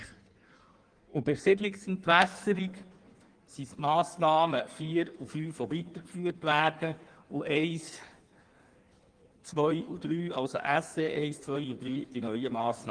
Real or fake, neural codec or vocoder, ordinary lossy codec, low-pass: fake; codec, 16 kHz in and 24 kHz out, 1.1 kbps, FireRedTTS-2 codec; Opus, 24 kbps; 9.9 kHz